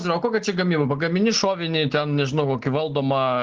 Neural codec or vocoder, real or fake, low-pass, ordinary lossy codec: none; real; 7.2 kHz; Opus, 16 kbps